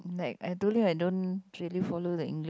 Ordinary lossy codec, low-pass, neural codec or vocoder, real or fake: none; none; none; real